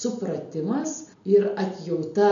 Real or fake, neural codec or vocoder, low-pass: real; none; 7.2 kHz